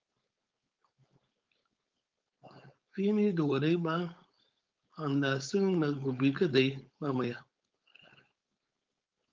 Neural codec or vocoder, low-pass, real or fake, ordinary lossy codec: codec, 16 kHz, 4.8 kbps, FACodec; 7.2 kHz; fake; Opus, 24 kbps